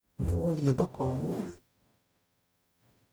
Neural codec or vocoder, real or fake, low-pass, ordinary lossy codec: codec, 44.1 kHz, 0.9 kbps, DAC; fake; none; none